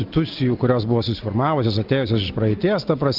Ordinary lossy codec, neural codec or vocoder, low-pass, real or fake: Opus, 32 kbps; none; 5.4 kHz; real